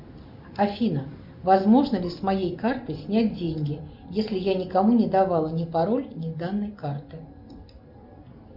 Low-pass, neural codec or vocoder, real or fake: 5.4 kHz; none; real